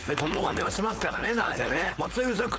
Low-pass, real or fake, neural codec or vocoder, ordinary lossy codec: none; fake; codec, 16 kHz, 4.8 kbps, FACodec; none